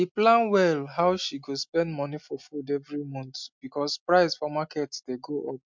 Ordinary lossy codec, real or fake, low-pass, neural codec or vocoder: MP3, 64 kbps; real; 7.2 kHz; none